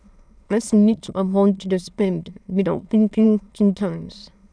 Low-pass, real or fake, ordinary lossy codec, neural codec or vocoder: none; fake; none; autoencoder, 22.05 kHz, a latent of 192 numbers a frame, VITS, trained on many speakers